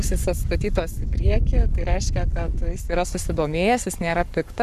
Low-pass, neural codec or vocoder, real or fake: 14.4 kHz; codec, 44.1 kHz, 7.8 kbps, Pupu-Codec; fake